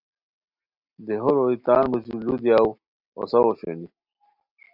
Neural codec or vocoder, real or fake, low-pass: none; real; 5.4 kHz